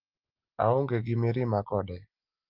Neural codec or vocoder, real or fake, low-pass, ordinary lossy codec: none; real; 5.4 kHz; Opus, 24 kbps